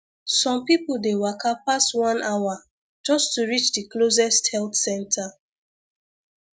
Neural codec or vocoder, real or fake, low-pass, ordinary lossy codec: none; real; none; none